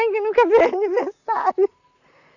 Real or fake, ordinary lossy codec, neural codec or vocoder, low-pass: fake; none; vocoder, 44.1 kHz, 128 mel bands, Pupu-Vocoder; 7.2 kHz